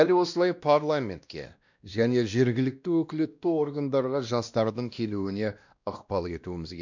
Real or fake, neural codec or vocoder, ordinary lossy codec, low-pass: fake; codec, 16 kHz, 1 kbps, X-Codec, WavLM features, trained on Multilingual LibriSpeech; none; 7.2 kHz